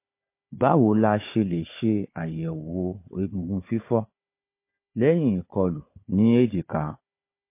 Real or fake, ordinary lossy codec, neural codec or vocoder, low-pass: fake; MP3, 24 kbps; codec, 16 kHz, 4 kbps, FunCodec, trained on Chinese and English, 50 frames a second; 3.6 kHz